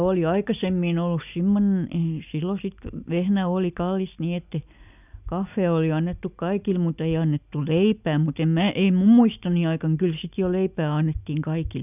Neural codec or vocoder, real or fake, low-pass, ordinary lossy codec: none; real; 3.6 kHz; none